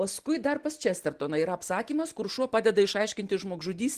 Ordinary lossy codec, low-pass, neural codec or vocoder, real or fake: Opus, 16 kbps; 14.4 kHz; none; real